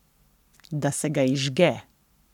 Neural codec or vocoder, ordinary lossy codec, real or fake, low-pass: codec, 44.1 kHz, 7.8 kbps, Pupu-Codec; none; fake; 19.8 kHz